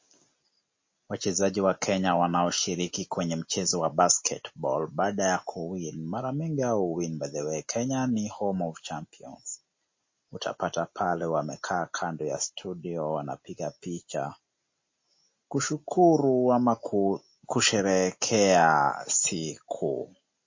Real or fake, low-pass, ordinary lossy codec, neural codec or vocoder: real; 7.2 kHz; MP3, 32 kbps; none